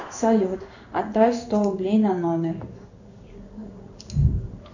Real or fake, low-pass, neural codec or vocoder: fake; 7.2 kHz; codec, 16 kHz in and 24 kHz out, 1 kbps, XY-Tokenizer